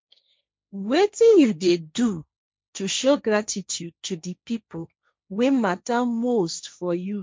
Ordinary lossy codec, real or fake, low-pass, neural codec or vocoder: none; fake; none; codec, 16 kHz, 1.1 kbps, Voila-Tokenizer